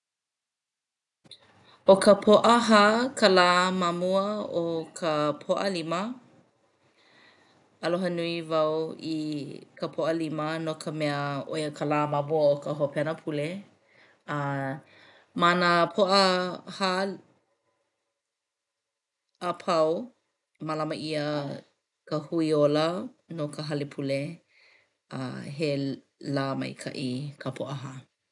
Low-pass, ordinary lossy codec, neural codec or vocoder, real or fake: 10.8 kHz; none; none; real